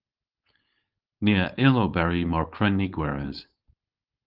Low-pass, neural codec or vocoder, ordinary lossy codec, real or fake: 5.4 kHz; codec, 16 kHz, 4.8 kbps, FACodec; Opus, 24 kbps; fake